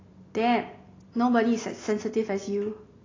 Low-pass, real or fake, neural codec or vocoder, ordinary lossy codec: 7.2 kHz; real; none; AAC, 32 kbps